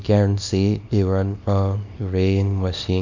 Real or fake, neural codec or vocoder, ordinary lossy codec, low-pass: fake; codec, 24 kHz, 0.9 kbps, WavTokenizer, small release; MP3, 48 kbps; 7.2 kHz